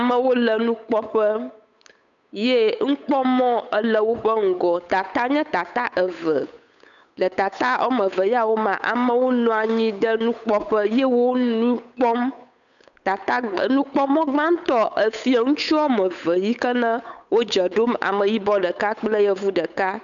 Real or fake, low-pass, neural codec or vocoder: fake; 7.2 kHz; codec, 16 kHz, 8 kbps, FunCodec, trained on Chinese and English, 25 frames a second